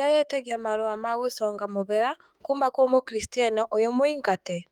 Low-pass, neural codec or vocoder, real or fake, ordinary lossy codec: 19.8 kHz; autoencoder, 48 kHz, 32 numbers a frame, DAC-VAE, trained on Japanese speech; fake; Opus, 32 kbps